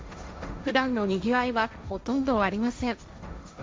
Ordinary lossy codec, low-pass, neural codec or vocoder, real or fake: none; none; codec, 16 kHz, 1.1 kbps, Voila-Tokenizer; fake